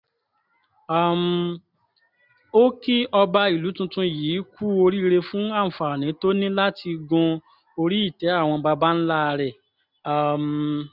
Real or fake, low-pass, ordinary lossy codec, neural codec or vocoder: real; 5.4 kHz; none; none